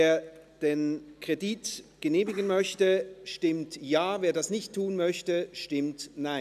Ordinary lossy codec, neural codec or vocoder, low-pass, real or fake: none; none; 14.4 kHz; real